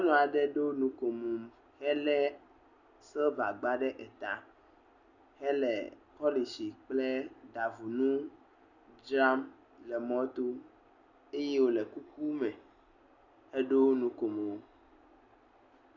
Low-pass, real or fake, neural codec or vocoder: 7.2 kHz; real; none